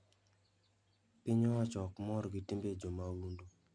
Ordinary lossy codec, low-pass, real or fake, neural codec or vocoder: none; 9.9 kHz; real; none